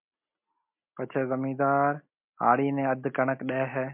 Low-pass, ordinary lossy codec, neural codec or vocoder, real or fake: 3.6 kHz; MP3, 32 kbps; none; real